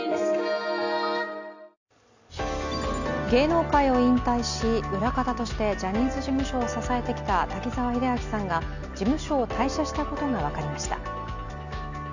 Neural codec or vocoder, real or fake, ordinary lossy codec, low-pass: none; real; none; 7.2 kHz